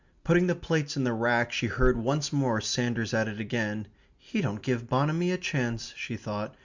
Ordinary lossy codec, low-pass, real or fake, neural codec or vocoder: Opus, 64 kbps; 7.2 kHz; real; none